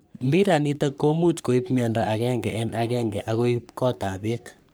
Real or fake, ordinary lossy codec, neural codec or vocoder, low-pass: fake; none; codec, 44.1 kHz, 3.4 kbps, Pupu-Codec; none